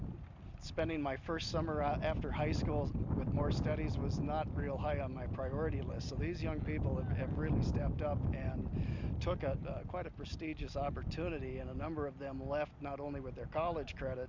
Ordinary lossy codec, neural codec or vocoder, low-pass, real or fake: MP3, 64 kbps; none; 7.2 kHz; real